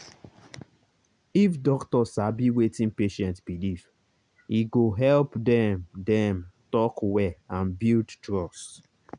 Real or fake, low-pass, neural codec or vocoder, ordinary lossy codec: real; 9.9 kHz; none; none